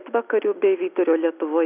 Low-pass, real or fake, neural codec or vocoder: 3.6 kHz; real; none